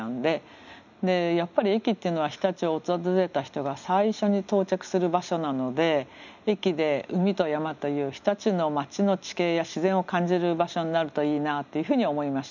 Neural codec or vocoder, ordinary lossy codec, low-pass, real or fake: none; none; 7.2 kHz; real